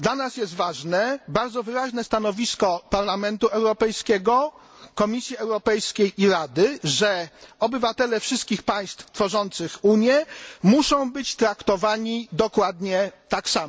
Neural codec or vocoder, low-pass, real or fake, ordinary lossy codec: none; none; real; none